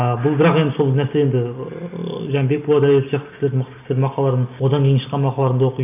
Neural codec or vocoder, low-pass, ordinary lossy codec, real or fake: none; 3.6 kHz; none; real